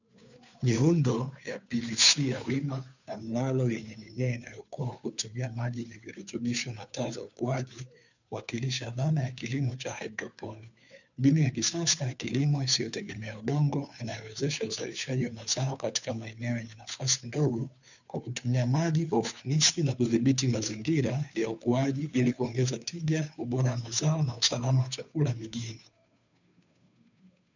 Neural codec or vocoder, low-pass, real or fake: codec, 16 kHz, 2 kbps, FunCodec, trained on Chinese and English, 25 frames a second; 7.2 kHz; fake